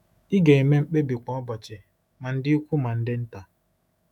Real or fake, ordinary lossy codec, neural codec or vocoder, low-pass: fake; none; autoencoder, 48 kHz, 128 numbers a frame, DAC-VAE, trained on Japanese speech; 19.8 kHz